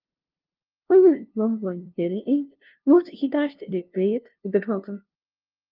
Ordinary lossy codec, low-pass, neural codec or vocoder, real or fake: Opus, 24 kbps; 5.4 kHz; codec, 16 kHz, 0.5 kbps, FunCodec, trained on LibriTTS, 25 frames a second; fake